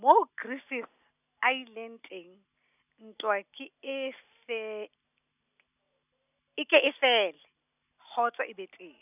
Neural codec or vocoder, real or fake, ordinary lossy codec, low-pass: none; real; none; 3.6 kHz